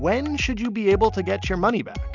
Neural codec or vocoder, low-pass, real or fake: none; 7.2 kHz; real